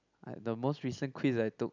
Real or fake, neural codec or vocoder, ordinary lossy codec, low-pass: real; none; none; 7.2 kHz